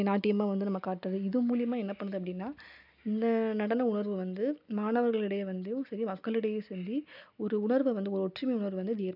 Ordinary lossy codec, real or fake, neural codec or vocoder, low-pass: none; real; none; 5.4 kHz